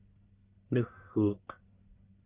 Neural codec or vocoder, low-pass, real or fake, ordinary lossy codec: codec, 44.1 kHz, 1.7 kbps, Pupu-Codec; 3.6 kHz; fake; Opus, 24 kbps